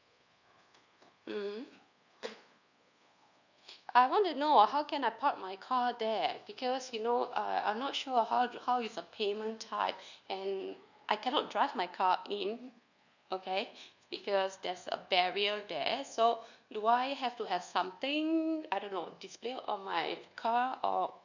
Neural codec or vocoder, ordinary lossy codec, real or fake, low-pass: codec, 24 kHz, 1.2 kbps, DualCodec; none; fake; 7.2 kHz